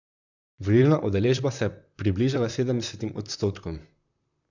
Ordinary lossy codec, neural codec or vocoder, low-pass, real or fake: none; vocoder, 44.1 kHz, 128 mel bands, Pupu-Vocoder; 7.2 kHz; fake